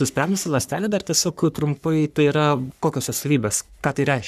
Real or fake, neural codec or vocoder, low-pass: fake; codec, 44.1 kHz, 3.4 kbps, Pupu-Codec; 14.4 kHz